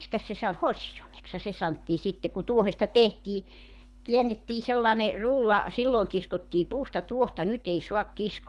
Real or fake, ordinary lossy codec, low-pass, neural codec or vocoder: fake; none; none; codec, 24 kHz, 3 kbps, HILCodec